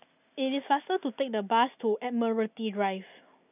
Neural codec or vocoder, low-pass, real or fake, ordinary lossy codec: none; 3.6 kHz; real; none